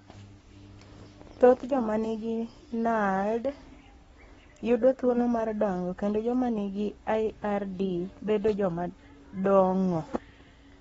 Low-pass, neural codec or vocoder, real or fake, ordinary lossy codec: 19.8 kHz; codec, 44.1 kHz, 7.8 kbps, Pupu-Codec; fake; AAC, 24 kbps